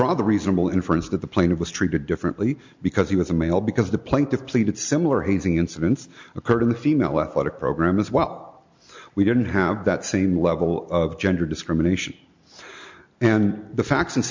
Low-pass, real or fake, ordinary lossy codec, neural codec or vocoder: 7.2 kHz; real; MP3, 64 kbps; none